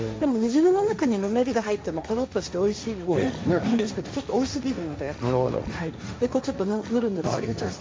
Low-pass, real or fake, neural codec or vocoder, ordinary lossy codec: none; fake; codec, 16 kHz, 1.1 kbps, Voila-Tokenizer; none